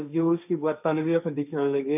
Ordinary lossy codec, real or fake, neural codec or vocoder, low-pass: none; fake; codec, 16 kHz, 1.1 kbps, Voila-Tokenizer; 3.6 kHz